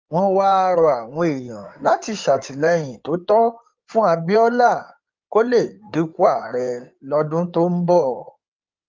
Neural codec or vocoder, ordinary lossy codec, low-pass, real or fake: codec, 16 kHz in and 24 kHz out, 2.2 kbps, FireRedTTS-2 codec; Opus, 24 kbps; 7.2 kHz; fake